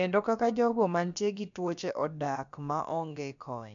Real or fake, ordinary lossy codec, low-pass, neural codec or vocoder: fake; none; 7.2 kHz; codec, 16 kHz, about 1 kbps, DyCAST, with the encoder's durations